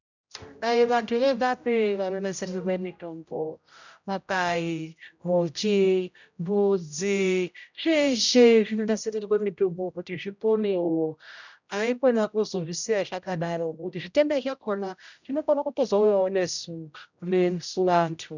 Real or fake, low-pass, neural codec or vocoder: fake; 7.2 kHz; codec, 16 kHz, 0.5 kbps, X-Codec, HuBERT features, trained on general audio